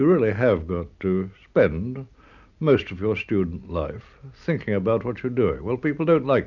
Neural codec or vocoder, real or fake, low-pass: none; real; 7.2 kHz